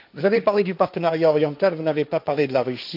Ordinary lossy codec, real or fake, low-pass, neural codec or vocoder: AAC, 48 kbps; fake; 5.4 kHz; codec, 16 kHz, 1.1 kbps, Voila-Tokenizer